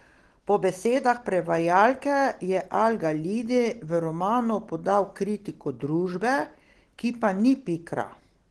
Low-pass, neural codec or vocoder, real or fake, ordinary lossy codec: 10.8 kHz; none; real; Opus, 16 kbps